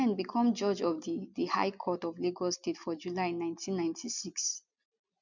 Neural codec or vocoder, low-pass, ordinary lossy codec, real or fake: none; 7.2 kHz; MP3, 64 kbps; real